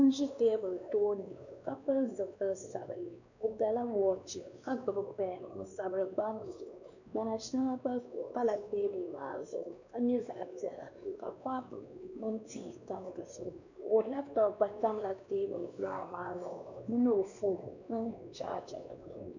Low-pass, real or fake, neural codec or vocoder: 7.2 kHz; fake; codec, 16 kHz, 2 kbps, X-Codec, WavLM features, trained on Multilingual LibriSpeech